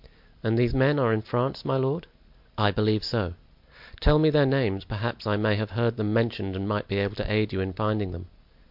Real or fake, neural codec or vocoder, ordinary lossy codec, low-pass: real; none; MP3, 48 kbps; 5.4 kHz